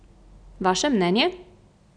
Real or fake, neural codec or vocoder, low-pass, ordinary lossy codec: real; none; 9.9 kHz; none